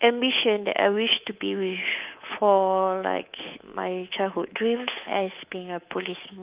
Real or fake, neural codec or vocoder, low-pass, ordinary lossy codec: fake; codec, 24 kHz, 3.1 kbps, DualCodec; 3.6 kHz; Opus, 32 kbps